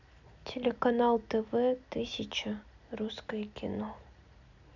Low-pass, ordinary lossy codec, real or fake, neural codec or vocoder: 7.2 kHz; none; real; none